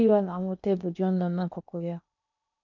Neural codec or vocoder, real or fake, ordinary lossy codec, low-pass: codec, 16 kHz in and 24 kHz out, 0.8 kbps, FocalCodec, streaming, 65536 codes; fake; none; 7.2 kHz